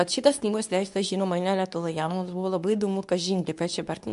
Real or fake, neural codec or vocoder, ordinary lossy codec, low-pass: fake; codec, 24 kHz, 0.9 kbps, WavTokenizer, medium speech release version 2; AAC, 96 kbps; 10.8 kHz